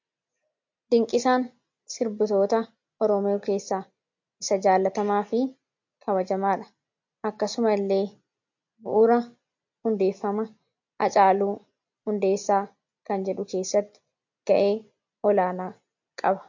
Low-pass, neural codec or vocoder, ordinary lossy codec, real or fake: 7.2 kHz; none; MP3, 48 kbps; real